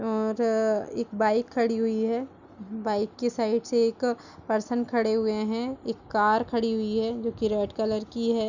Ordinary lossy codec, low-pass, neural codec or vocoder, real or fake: none; 7.2 kHz; none; real